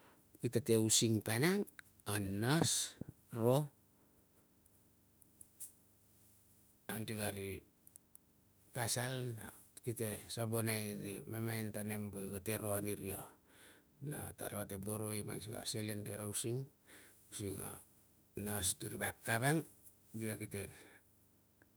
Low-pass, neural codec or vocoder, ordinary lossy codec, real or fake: none; autoencoder, 48 kHz, 32 numbers a frame, DAC-VAE, trained on Japanese speech; none; fake